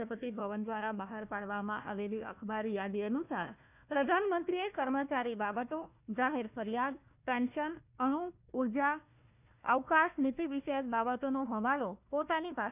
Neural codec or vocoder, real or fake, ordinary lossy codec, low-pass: codec, 16 kHz, 1 kbps, FunCodec, trained on Chinese and English, 50 frames a second; fake; none; 3.6 kHz